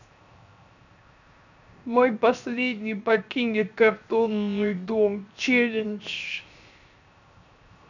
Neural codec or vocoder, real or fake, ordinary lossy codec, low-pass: codec, 16 kHz, 0.7 kbps, FocalCodec; fake; none; 7.2 kHz